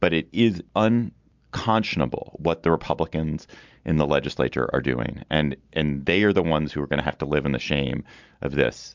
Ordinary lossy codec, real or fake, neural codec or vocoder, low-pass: MP3, 64 kbps; real; none; 7.2 kHz